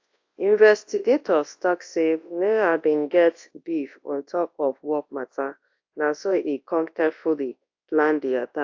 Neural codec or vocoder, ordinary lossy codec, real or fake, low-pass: codec, 24 kHz, 0.9 kbps, WavTokenizer, large speech release; AAC, 48 kbps; fake; 7.2 kHz